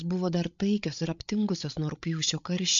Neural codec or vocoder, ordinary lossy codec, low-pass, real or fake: codec, 16 kHz, 8 kbps, FreqCodec, larger model; MP3, 64 kbps; 7.2 kHz; fake